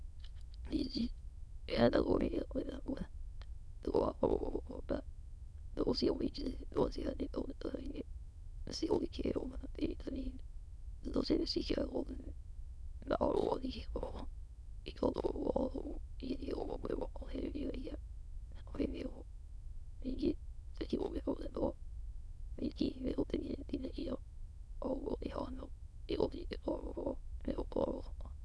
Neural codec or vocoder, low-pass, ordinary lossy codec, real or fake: autoencoder, 22.05 kHz, a latent of 192 numbers a frame, VITS, trained on many speakers; none; none; fake